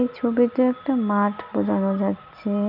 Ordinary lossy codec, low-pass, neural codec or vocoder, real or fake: none; 5.4 kHz; none; real